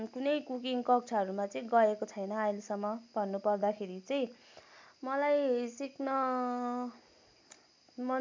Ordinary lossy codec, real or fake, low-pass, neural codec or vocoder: MP3, 64 kbps; real; 7.2 kHz; none